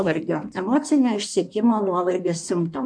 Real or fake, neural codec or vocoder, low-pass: fake; codec, 16 kHz in and 24 kHz out, 1.1 kbps, FireRedTTS-2 codec; 9.9 kHz